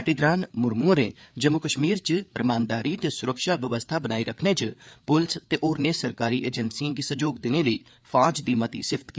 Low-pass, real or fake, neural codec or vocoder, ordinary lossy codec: none; fake; codec, 16 kHz, 4 kbps, FreqCodec, larger model; none